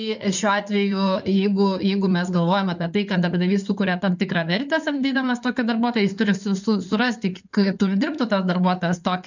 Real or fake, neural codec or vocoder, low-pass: fake; codec, 16 kHz in and 24 kHz out, 2.2 kbps, FireRedTTS-2 codec; 7.2 kHz